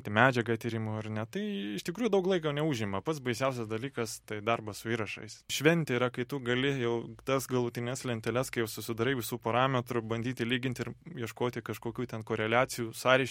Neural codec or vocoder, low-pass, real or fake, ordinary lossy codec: none; 19.8 kHz; real; MP3, 64 kbps